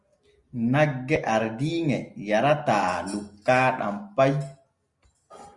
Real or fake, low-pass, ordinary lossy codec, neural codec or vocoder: real; 10.8 kHz; Opus, 64 kbps; none